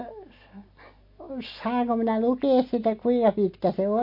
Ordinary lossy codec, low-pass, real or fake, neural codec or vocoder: MP3, 32 kbps; 5.4 kHz; real; none